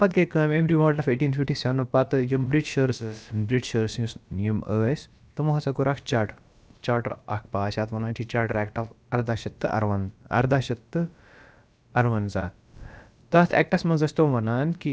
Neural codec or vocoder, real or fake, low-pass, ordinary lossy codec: codec, 16 kHz, about 1 kbps, DyCAST, with the encoder's durations; fake; none; none